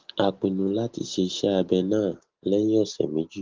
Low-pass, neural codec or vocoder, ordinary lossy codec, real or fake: 7.2 kHz; none; Opus, 16 kbps; real